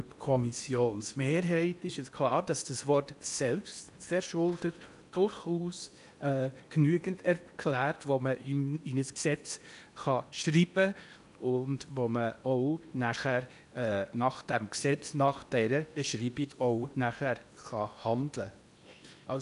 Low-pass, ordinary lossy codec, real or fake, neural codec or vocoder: 10.8 kHz; none; fake; codec, 16 kHz in and 24 kHz out, 0.8 kbps, FocalCodec, streaming, 65536 codes